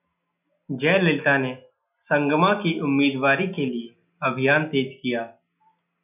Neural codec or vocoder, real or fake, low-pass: none; real; 3.6 kHz